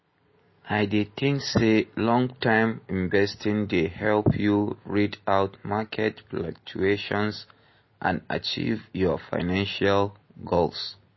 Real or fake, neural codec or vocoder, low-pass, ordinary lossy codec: real; none; 7.2 kHz; MP3, 24 kbps